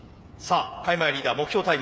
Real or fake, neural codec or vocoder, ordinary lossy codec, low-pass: fake; codec, 16 kHz, 8 kbps, FreqCodec, smaller model; none; none